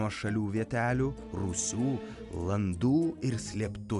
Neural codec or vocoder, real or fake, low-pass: none; real; 10.8 kHz